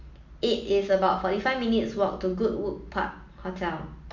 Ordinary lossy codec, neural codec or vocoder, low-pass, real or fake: MP3, 48 kbps; none; 7.2 kHz; real